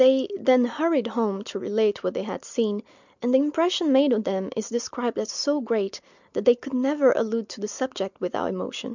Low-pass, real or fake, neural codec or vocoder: 7.2 kHz; real; none